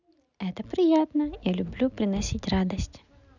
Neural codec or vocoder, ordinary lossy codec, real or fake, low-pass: none; none; real; 7.2 kHz